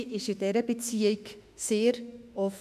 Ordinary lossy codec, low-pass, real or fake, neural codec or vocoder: none; 14.4 kHz; fake; autoencoder, 48 kHz, 32 numbers a frame, DAC-VAE, trained on Japanese speech